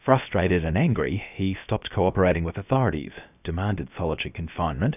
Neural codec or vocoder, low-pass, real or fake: codec, 16 kHz, about 1 kbps, DyCAST, with the encoder's durations; 3.6 kHz; fake